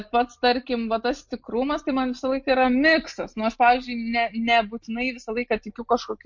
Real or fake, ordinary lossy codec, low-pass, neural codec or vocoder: real; MP3, 48 kbps; 7.2 kHz; none